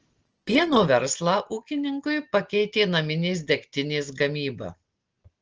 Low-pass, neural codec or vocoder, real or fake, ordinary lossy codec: 7.2 kHz; none; real; Opus, 16 kbps